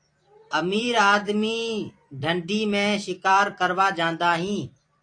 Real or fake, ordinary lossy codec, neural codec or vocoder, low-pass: fake; AAC, 48 kbps; vocoder, 44.1 kHz, 128 mel bands every 256 samples, BigVGAN v2; 9.9 kHz